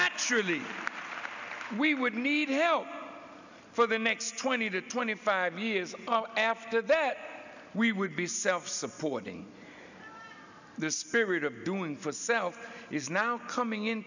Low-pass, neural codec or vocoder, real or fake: 7.2 kHz; none; real